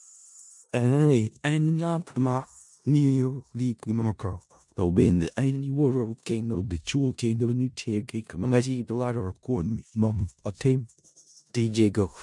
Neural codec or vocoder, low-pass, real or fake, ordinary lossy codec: codec, 16 kHz in and 24 kHz out, 0.4 kbps, LongCat-Audio-Codec, four codebook decoder; 10.8 kHz; fake; MP3, 64 kbps